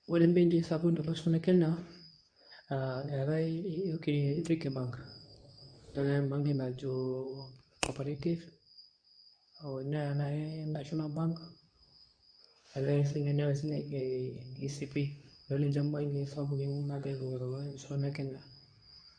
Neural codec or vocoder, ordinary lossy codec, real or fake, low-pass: codec, 24 kHz, 0.9 kbps, WavTokenizer, medium speech release version 2; none; fake; 9.9 kHz